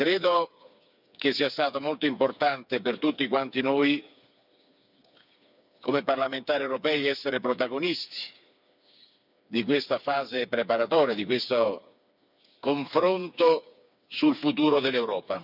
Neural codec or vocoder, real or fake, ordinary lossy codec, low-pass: codec, 16 kHz, 4 kbps, FreqCodec, smaller model; fake; none; 5.4 kHz